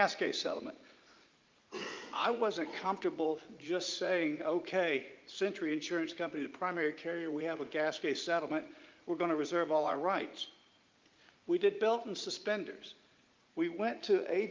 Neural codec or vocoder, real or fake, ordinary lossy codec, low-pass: vocoder, 44.1 kHz, 80 mel bands, Vocos; fake; Opus, 32 kbps; 7.2 kHz